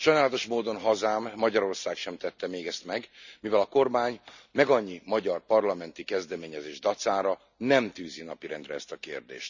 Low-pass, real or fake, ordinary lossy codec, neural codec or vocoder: 7.2 kHz; real; none; none